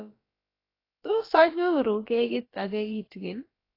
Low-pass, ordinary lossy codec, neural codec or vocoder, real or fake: 5.4 kHz; AAC, 24 kbps; codec, 16 kHz, about 1 kbps, DyCAST, with the encoder's durations; fake